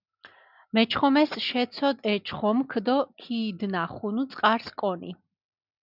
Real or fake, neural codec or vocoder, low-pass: real; none; 5.4 kHz